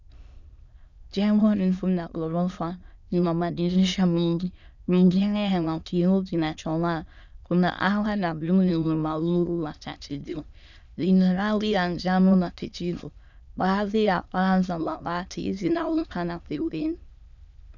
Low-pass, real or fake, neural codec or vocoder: 7.2 kHz; fake; autoencoder, 22.05 kHz, a latent of 192 numbers a frame, VITS, trained on many speakers